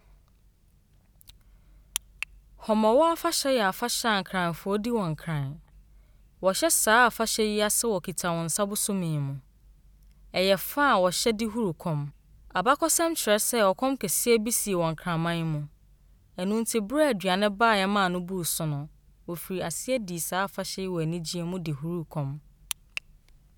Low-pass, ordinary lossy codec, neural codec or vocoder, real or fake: none; none; none; real